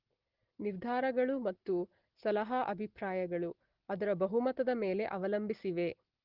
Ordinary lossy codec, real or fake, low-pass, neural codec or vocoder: Opus, 16 kbps; real; 5.4 kHz; none